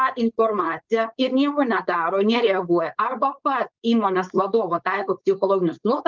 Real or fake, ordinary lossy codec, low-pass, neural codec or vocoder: fake; Opus, 24 kbps; 7.2 kHz; codec, 16 kHz, 4.8 kbps, FACodec